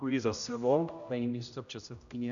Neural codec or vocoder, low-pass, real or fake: codec, 16 kHz, 0.5 kbps, X-Codec, HuBERT features, trained on general audio; 7.2 kHz; fake